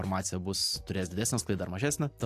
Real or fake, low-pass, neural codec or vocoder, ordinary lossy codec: real; 14.4 kHz; none; AAC, 64 kbps